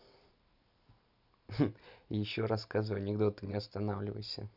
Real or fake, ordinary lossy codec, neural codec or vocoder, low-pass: real; none; none; 5.4 kHz